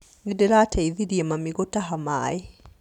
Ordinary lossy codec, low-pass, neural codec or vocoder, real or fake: none; 19.8 kHz; none; real